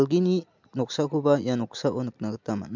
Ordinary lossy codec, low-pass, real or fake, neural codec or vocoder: MP3, 64 kbps; 7.2 kHz; real; none